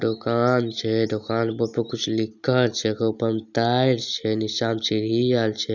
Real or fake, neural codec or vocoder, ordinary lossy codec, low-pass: real; none; none; 7.2 kHz